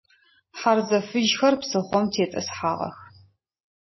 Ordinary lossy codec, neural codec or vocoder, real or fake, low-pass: MP3, 24 kbps; none; real; 7.2 kHz